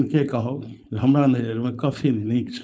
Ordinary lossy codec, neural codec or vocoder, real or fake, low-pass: none; codec, 16 kHz, 4.8 kbps, FACodec; fake; none